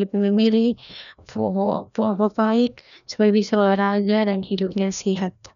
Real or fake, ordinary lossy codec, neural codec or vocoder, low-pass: fake; none; codec, 16 kHz, 1 kbps, FreqCodec, larger model; 7.2 kHz